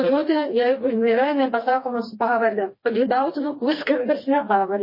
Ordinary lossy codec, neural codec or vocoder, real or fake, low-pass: MP3, 24 kbps; codec, 16 kHz, 2 kbps, FreqCodec, smaller model; fake; 5.4 kHz